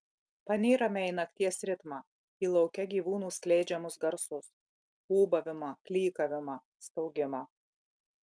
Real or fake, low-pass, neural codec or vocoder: real; 9.9 kHz; none